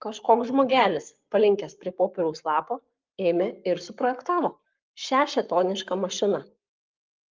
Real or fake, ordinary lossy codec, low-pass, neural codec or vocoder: fake; Opus, 24 kbps; 7.2 kHz; codec, 44.1 kHz, 7.8 kbps, Pupu-Codec